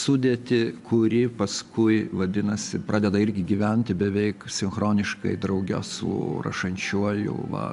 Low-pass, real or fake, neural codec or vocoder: 10.8 kHz; fake; vocoder, 24 kHz, 100 mel bands, Vocos